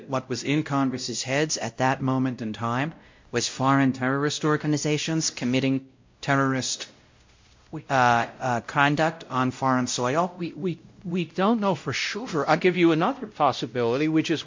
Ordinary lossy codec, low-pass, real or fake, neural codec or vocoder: MP3, 48 kbps; 7.2 kHz; fake; codec, 16 kHz, 0.5 kbps, X-Codec, WavLM features, trained on Multilingual LibriSpeech